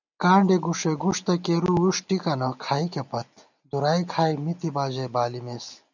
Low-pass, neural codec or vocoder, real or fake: 7.2 kHz; none; real